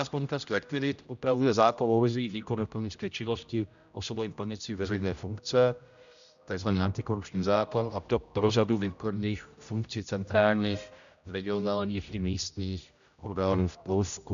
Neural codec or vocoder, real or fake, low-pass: codec, 16 kHz, 0.5 kbps, X-Codec, HuBERT features, trained on general audio; fake; 7.2 kHz